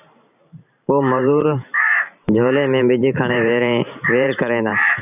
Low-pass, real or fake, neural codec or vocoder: 3.6 kHz; fake; vocoder, 44.1 kHz, 128 mel bands every 512 samples, BigVGAN v2